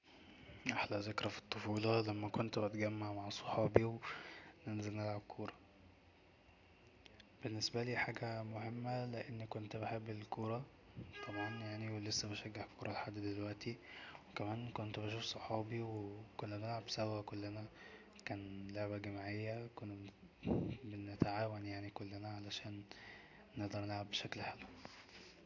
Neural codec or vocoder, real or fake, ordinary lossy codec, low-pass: none; real; AAC, 48 kbps; 7.2 kHz